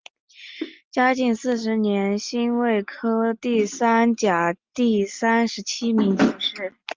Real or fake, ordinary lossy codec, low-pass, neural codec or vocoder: real; Opus, 24 kbps; 7.2 kHz; none